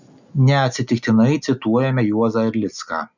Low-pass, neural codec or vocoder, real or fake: 7.2 kHz; none; real